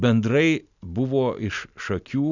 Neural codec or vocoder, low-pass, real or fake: none; 7.2 kHz; real